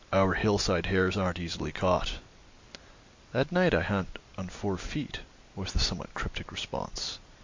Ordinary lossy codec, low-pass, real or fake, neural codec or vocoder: MP3, 48 kbps; 7.2 kHz; real; none